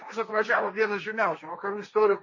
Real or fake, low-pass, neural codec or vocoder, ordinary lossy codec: fake; 7.2 kHz; codec, 16 kHz, 1.1 kbps, Voila-Tokenizer; MP3, 32 kbps